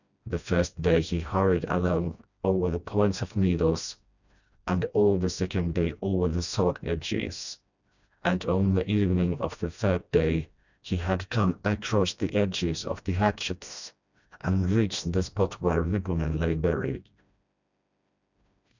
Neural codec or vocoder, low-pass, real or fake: codec, 16 kHz, 1 kbps, FreqCodec, smaller model; 7.2 kHz; fake